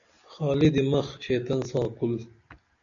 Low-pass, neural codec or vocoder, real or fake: 7.2 kHz; none; real